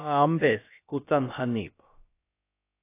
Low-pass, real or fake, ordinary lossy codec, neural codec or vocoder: 3.6 kHz; fake; AAC, 24 kbps; codec, 16 kHz, about 1 kbps, DyCAST, with the encoder's durations